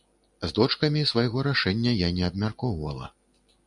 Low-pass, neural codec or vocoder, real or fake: 10.8 kHz; none; real